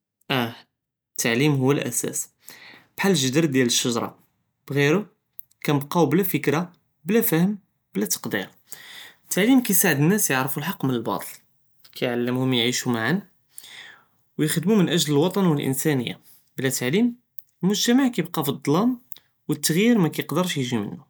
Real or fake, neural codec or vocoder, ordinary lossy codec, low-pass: real; none; none; none